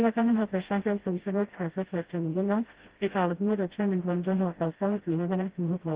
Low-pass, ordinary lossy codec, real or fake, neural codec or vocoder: 3.6 kHz; Opus, 16 kbps; fake; codec, 16 kHz, 0.5 kbps, FreqCodec, smaller model